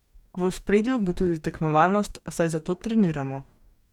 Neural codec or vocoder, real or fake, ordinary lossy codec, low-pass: codec, 44.1 kHz, 2.6 kbps, DAC; fake; none; 19.8 kHz